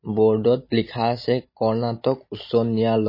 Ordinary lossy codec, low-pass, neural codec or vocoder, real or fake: MP3, 24 kbps; 5.4 kHz; none; real